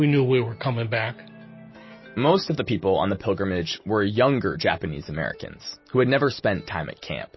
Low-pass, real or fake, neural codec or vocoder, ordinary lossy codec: 7.2 kHz; real; none; MP3, 24 kbps